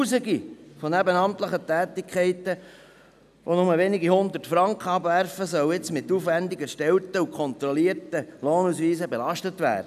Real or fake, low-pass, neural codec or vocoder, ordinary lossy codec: real; 14.4 kHz; none; none